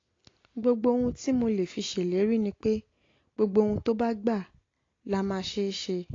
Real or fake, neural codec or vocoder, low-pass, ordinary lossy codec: real; none; 7.2 kHz; AAC, 32 kbps